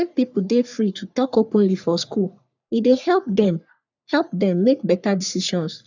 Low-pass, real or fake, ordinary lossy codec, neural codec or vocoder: 7.2 kHz; fake; none; codec, 44.1 kHz, 3.4 kbps, Pupu-Codec